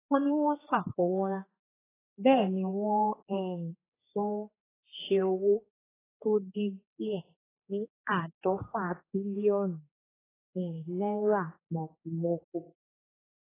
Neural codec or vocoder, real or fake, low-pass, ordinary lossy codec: codec, 16 kHz, 4 kbps, X-Codec, HuBERT features, trained on general audio; fake; 3.6 kHz; AAC, 16 kbps